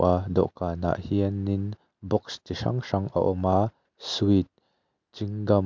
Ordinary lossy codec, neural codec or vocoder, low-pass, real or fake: MP3, 64 kbps; none; 7.2 kHz; real